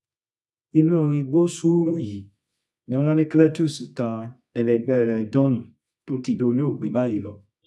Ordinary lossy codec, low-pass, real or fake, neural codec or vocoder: none; none; fake; codec, 24 kHz, 0.9 kbps, WavTokenizer, medium music audio release